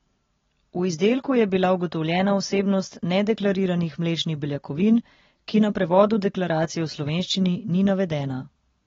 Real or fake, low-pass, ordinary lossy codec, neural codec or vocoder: real; 7.2 kHz; AAC, 24 kbps; none